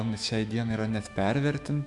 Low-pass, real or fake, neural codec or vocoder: 10.8 kHz; fake; autoencoder, 48 kHz, 128 numbers a frame, DAC-VAE, trained on Japanese speech